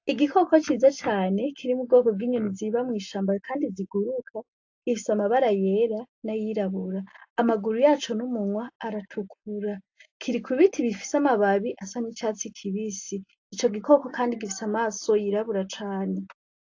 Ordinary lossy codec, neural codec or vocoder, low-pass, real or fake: AAC, 48 kbps; none; 7.2 kHz; real